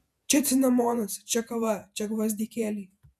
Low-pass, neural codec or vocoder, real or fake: 14.4 kHz; vocoder, 48 kHz, 128 mel bands, Vocos; fake